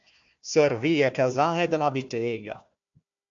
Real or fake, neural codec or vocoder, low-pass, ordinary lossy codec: fake; codec, 16 kHz, 1 kbps, FunCodec, trained on Chinese and English, 50 frames a second; 7.2 kHz; AAC, 64 kbps